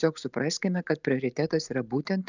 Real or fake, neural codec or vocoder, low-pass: real; none; 7.2 kHz